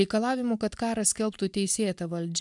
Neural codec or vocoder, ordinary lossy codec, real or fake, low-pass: none; MP3, 96 kbps; real; 10.8 kHz